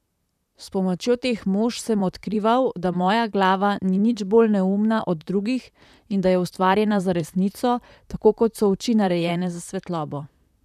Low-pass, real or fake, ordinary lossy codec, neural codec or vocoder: 14.4 kHz; fake; none; vocoder, 44.1 kHz, 128 mel bands, Pupu-Vocoder